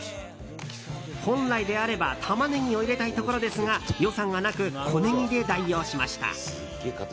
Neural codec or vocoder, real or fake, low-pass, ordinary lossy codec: none; real; none; none